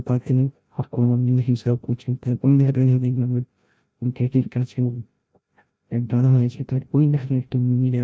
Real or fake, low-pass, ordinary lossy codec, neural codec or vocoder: fake; none; none; codec, 16 kHz, 0.5 kbps, FreqCodec, larger model